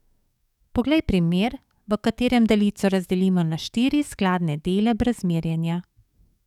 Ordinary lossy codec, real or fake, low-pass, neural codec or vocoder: none; fake; 19.8 kHz; codec, 44.1 kHz, 7.8 kbps, DAC